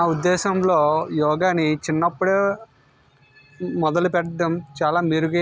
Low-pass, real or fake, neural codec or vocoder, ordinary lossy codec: none; real; none; none